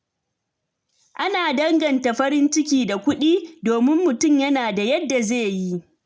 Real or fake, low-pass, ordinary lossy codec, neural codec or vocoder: real; none; none; none